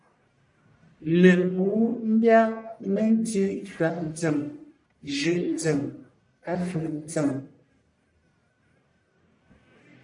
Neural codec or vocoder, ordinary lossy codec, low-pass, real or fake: codec, 44.1 kHz, 1.7 kbps, Pupu-Codec; MP3, 96 kbps; 10.8 kHz; fake